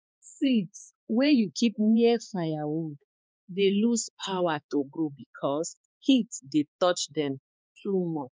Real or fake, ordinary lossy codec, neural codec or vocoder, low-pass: fake; none; codec, 16 kHz, 4 kbps, X-Codec, HuBERT features, trained on balanced general audio; none